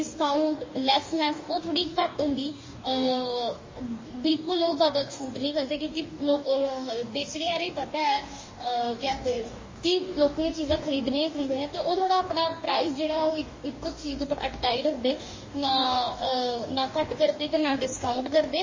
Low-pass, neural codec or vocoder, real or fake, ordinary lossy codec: 7.2 kHz; codec, 44.1 kHz, 2.6 kbps, DAC; fake; MP3, 32 kbps